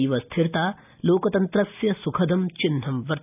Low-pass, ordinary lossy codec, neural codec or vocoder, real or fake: 3.6 kHz; none; none; real